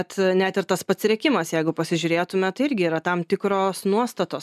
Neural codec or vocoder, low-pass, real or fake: none; 14.4 kHz; real